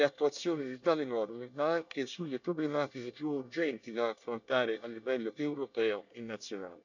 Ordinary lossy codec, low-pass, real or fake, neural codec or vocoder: none; 7.2 kHz; fake; codec, 24 kHz, 1 kbps, SNAC